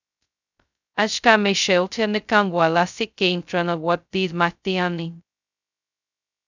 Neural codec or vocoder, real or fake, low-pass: codec, 16 kHz, 0.2 kbps, FocalCodec; fake; 7.2 kHz